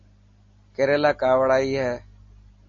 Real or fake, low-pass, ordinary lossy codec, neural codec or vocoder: real; 7.2 kHz; MP3, 32 kbps; none